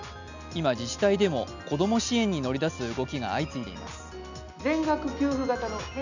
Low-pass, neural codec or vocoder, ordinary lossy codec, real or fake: 7.2 kHz; none; none; real